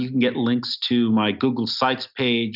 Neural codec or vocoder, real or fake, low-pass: none; real; 5.4 kHz